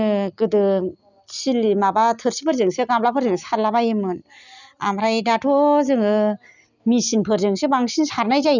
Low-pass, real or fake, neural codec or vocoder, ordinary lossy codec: 7.2 kHz; real; none; none